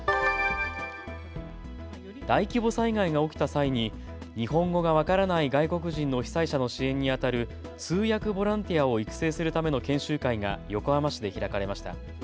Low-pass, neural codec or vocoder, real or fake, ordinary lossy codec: none; none; real; none